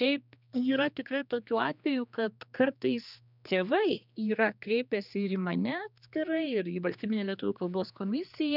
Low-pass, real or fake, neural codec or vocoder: 5.4 kHz; fake; codec, 16 kHz, 2 kbps, X-Codec, HuBERT features, trained on general audio